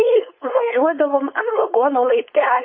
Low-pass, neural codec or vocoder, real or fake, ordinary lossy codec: 7.2 kHz; codec, 16 kHz, 4.8 kbps, FACodec; fake; MP3, 24 kbps